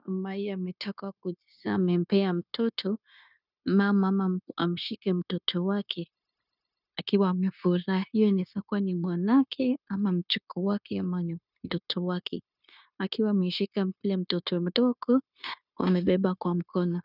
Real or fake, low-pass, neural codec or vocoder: fake; 5.4 kHz; codec, 16 kHz, 0.9 kbps, LongCat-Audio-Codec